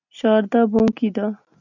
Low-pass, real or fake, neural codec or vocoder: 7.2 kHz; real; none